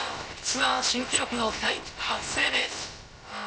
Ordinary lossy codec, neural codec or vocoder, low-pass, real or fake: none; codec, 16 kHz, about 1 kbps, DyCAST, with the encoder's durations; none; fake